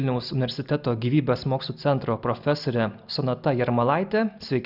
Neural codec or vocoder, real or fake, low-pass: none; real; 5.4 kHz